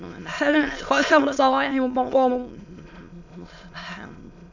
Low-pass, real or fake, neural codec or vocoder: 7.2 kHz; fake; autoencoder, 22.05 kHz, a latent of 192 numbers a frame, VITS, trained on many speakers